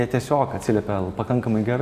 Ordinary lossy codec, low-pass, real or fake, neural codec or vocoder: AAC, 96 kbps; 14.4 kHz; real; none